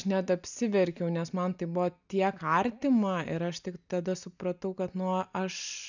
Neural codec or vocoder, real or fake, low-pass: none; real; 7.2 kHz